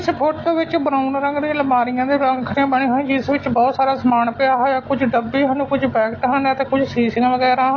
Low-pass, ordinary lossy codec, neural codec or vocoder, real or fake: 7.2 kHz; AAC, 32 kbps; none; real